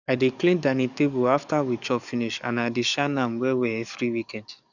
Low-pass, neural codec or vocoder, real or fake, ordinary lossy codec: 7.2 kHz; codec, 44.1 kHz, 7.8 kbps, DAC; fake; none